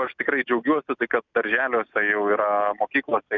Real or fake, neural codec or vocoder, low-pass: real; none; 7.2 kHz